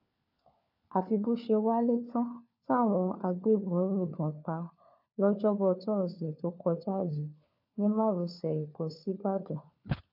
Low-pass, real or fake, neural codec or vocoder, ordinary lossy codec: 5.4 kHz; fake; codec, 16 kHz, 4 kbps, FunCodec, trained on LibriTTS, 50 frames a second; AAC, 48 kbps